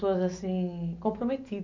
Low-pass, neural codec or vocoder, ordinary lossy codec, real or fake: 7.2 kHz; none; MP3, 64 kbps; real